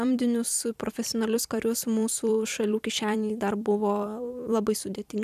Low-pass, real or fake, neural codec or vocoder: 14.4 kHz; fake; vocoder, 44.1 kHz, 128 mel bands every 256 samples, BigVGAN v2